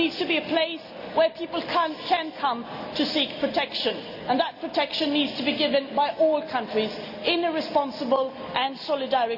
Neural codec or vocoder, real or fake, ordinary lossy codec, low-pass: none; real; none; 5.4 kHz